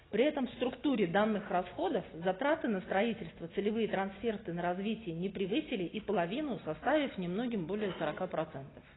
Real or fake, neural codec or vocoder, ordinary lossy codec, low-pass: real; none; AAC, 16 kbps; 7.2 kHz